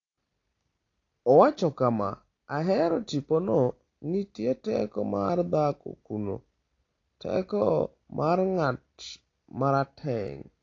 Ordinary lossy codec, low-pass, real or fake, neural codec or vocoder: AAC, 32 kbps; 7.2 kHz; real; none